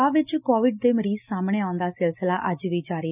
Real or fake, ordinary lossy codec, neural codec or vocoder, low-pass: real; none; none; 3.6 kHz